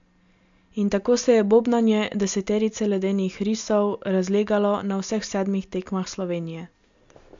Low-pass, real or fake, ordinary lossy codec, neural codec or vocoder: 7.2 kHz; real; MP3, 64 kbps; none